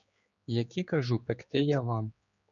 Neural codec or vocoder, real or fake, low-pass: codec, 16 kHz, 4 kbps, X-Codec, HuBERT features, trained on general audio; fake; 7.2 kHz